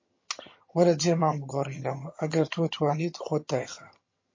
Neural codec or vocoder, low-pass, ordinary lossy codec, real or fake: vocoder, 22.05 kHz, 80 mel bands, HiFi-GAN; 7.2 kHz; MP3, 32 kbps; fake